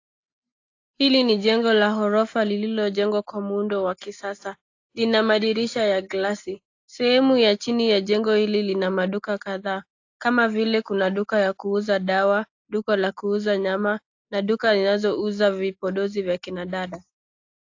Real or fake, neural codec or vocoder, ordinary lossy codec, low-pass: real; none; AAC, 48 kbps; 7.2 kHz